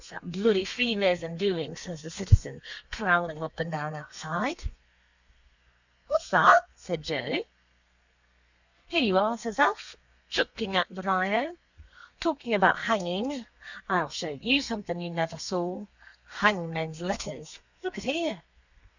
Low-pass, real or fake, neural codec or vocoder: 7.2 kHz; fake; codec, 32 kHz, 1.9 kbps, SNAC